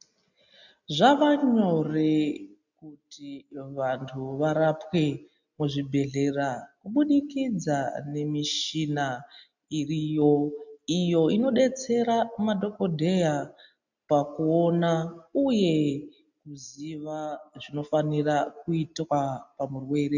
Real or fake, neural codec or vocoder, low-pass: real; none; 7.2 kHz